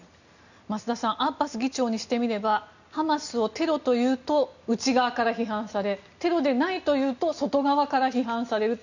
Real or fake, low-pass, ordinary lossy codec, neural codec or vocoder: real; 7.2 kHz; none; none